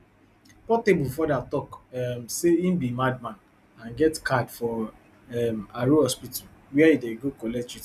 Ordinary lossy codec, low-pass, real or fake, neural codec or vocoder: none; 14.4 kHz; real; none